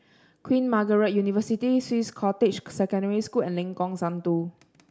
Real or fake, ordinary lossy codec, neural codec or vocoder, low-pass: real; none; none; none